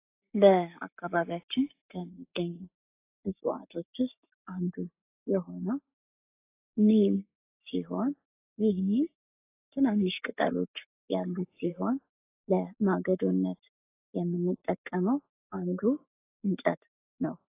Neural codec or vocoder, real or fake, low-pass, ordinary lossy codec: codec, 44.1 kHz, 7.8 kbps, Pupu-Codec; fake; 3.6 kHz; AAC, 24 kbps